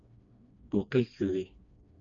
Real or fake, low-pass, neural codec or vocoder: fake; 7.2 kHz; codec, 16 kHz, 2 kbps, FreqCodec, smaller model